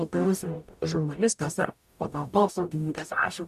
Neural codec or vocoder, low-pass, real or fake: codec, 44.1 kHz, 0.9 kbps, DAC; 14.4 kHz; fake